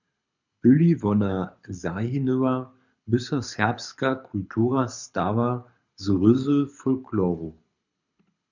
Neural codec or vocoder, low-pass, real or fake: codec, 24 kHz, 6 kbps, HILCodec; 7.2 kHz; fake